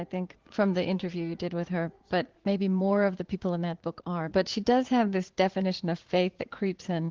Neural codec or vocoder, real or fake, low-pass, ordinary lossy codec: vocoder, 44.1 kHz, 80 mel bands, Vocos; fake; 7.2 kHz; Opus, 16 kbps